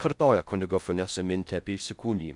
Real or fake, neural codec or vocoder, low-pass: fake; codec, 16 kHz in and 24 kHz out, 0.6 kbps, FocalCodec, streaming, 4096 codes; 10.8 kHz